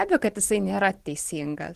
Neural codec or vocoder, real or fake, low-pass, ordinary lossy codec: vocoder, 44.1 kHz, 128 mel bands every 512 samples, BigVGAN v2; fake; 14.4 kHz; Opus, 16 kbps